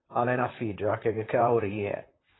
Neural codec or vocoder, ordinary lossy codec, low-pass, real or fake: vocoder, 44.1 kHz, 128 mel bands, Pupu-Vocoder; AAC, 16 kbps; 7.2 kHz; fake